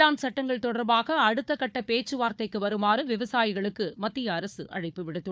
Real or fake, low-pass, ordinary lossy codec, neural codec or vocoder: fake; none; none; codec, 16 kHz, 4 kbps, FunCodec, trained on Chinese and English, 50 frames a second